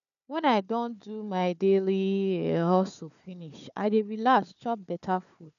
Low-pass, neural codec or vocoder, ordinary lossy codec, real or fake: 7.2 kHz; codec, 16 kHz, 4 kbps, FunCodec, trained on Chinese and English, 50 frames a second; none; fake